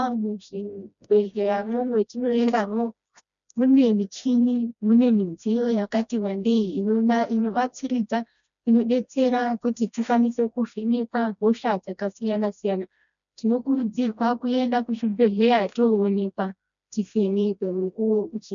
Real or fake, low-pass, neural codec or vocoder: fake; 7.2 kHz; codec, 16 kHz, 1 kbps, FreqCodec, smaller model